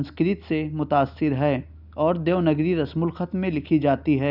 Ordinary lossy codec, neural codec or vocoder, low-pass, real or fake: none; none; 5.4 kHz; real